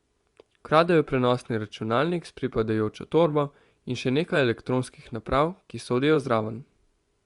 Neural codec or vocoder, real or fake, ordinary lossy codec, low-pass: vocoder, 24 kHz, 100 mel bands, Vocos; fake; Opus, 64 kbps; 10.8 kHz